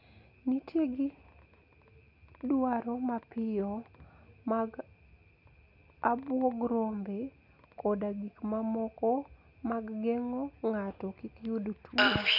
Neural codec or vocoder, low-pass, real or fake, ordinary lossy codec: none; 5.4 kHz; real; none